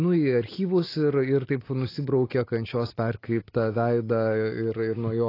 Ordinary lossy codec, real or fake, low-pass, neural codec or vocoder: AAC, 24 kbps; real; 5.4 kHz; none